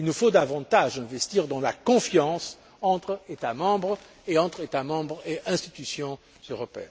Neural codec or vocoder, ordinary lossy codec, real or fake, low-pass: none; none; real; none